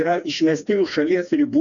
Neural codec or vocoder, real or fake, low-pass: codec, 16 kHz, 2 kbps, FreqCodec, smaller model; fake; 7.2 kHz